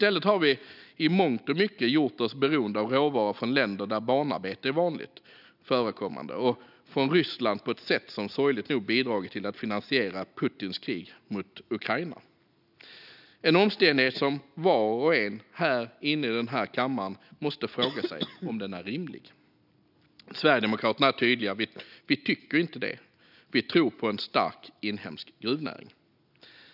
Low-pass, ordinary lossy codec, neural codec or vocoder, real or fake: 5.4 kHz; none; none; real